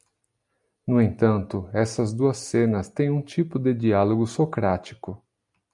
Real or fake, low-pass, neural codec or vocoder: real; 10.8 kHz; none